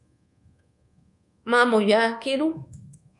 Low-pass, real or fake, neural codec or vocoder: 10.8 kHz; fake; codec, 24 kHz, 1.2 kbps, DualCodec